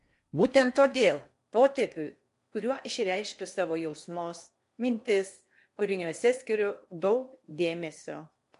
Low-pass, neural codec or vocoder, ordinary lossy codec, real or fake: 10.8 kHz; codec, 16 kHz in and 24 kHz out, 0.8 kbps, FocalCodec, streaming, 65536 codes; MP3, 64 kbps; fake